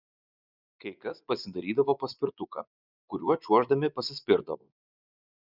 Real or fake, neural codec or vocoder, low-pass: fake; vocoder, 24 kHz, 100 mel bands, Vocos; 5.4 kHz